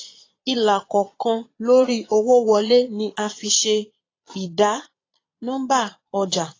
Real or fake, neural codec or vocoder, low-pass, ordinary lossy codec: fake; vocoder, 22.05 kHz, 80 mel bands, Vocos; 7.2 kHz; AAC, 32 kbps